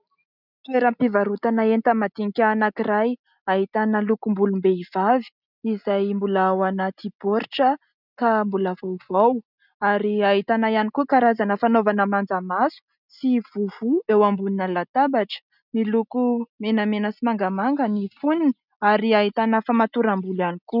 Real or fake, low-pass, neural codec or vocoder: real; 5.4 kHz; none